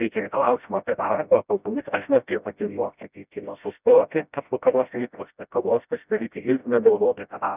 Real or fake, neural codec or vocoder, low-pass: fake; codec, 16 kHz, 0.5 kbps, FreqCodec, smaller model; 3.6 kHz